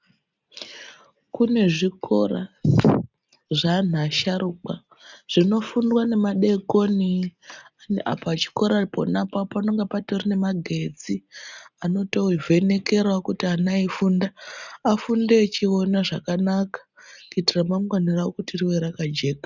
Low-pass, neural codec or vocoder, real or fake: 7.2 kHz; none; real